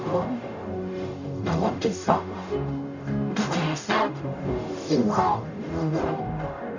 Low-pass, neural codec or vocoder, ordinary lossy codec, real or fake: 7.2 kHz; codec, 44.1 kHz, 0.9 kbps, DAC; none; fake